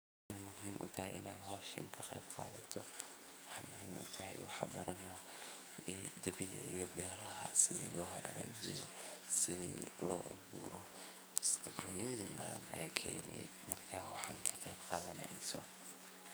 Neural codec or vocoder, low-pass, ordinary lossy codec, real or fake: codec, 44.1 kHz, 2.6 kbps, SNAC; none; none; fake